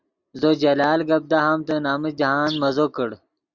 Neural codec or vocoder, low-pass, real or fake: none; 7.2 kHz; real